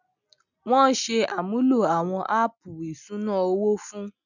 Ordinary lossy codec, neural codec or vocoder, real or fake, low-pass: none; none; real; 7.2 kHz